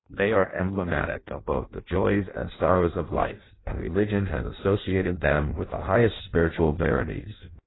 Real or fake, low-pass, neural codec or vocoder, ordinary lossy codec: fake; 7.2 kHz; codec, 16 kHz in and 24 kHz out, 0.6 kbps, FireRedTTS-2 codec; AAC, 16 kbps